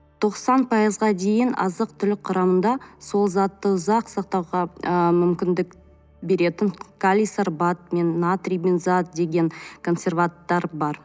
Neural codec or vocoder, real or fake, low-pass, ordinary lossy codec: none; real; none; none